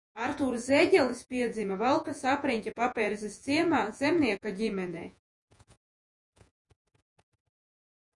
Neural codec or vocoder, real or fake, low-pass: vocoder, 48 kHz, 128 mel bands, Vocos; fake; 10.8 kHz